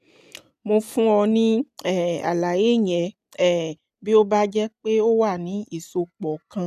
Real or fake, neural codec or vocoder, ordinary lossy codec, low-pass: real; none; none; 14.4 kHz